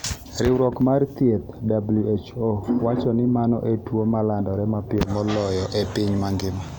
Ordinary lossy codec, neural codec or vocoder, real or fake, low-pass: none; none; real; none